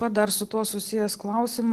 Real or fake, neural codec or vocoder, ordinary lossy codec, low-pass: real; none; Opus, 16 kbps; 14.4 kHz